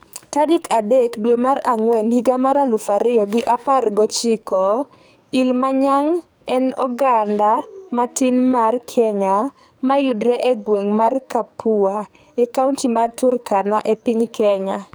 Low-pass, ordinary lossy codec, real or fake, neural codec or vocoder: none; none; fake; codec, 44.1 kHz, 2.6 kbps, SNAC